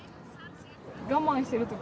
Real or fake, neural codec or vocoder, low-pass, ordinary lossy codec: real; none; none; none